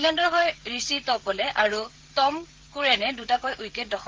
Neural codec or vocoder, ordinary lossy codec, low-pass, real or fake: codec, 16 kHz, 16 kbps, FreqCodec, smaller model; Opus, 16 kbps; 7.2 kHz; fake